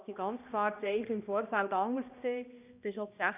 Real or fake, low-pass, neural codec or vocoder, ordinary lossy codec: fake; 3.6 kHz; codec, 16 kHz, 1 kbps, X-Codec, HuBERT features, trained on balanced general audio; none